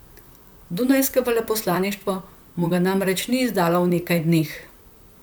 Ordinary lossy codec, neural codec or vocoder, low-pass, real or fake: none; vocoder, 44.1 kHz, 128 mel bands, Pupu-Vocoder; none; fake